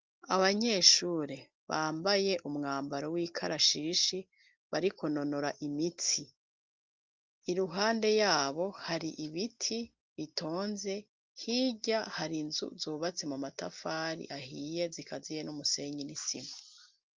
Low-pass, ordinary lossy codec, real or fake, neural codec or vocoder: 7.2 kHz; Opus, 32 kbps; real; none